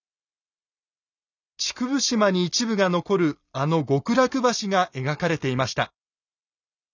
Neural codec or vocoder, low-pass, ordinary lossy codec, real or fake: none; 7.2 kHz; none; real